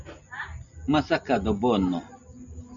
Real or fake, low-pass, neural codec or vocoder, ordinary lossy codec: real; 7.2 kHz; none; MP3, 96 kbps